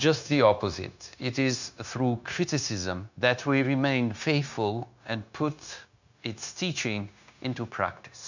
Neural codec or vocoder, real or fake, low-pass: codec, 16 kHz, 0.9 kbps, LongCat-Audio-Codec; fake; 7.2 kHz